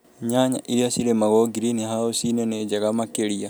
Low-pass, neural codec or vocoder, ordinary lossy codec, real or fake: none; none; none; real